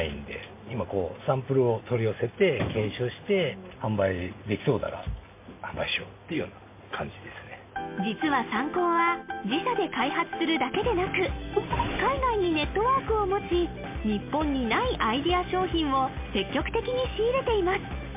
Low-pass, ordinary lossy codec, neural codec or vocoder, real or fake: 3.6 kHz; MP3, 24 kbps; none; real